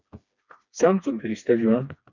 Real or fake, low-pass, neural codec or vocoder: fake; 7.2 kHz; codec, 16 kHz, 2 kbps, FreqCodec, smaller model